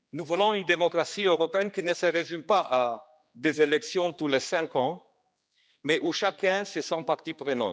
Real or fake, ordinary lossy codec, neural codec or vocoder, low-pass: fake; none; codec, 16 kHz, 2 kbps, X-Codec, HuBERT features, trained on general audio; none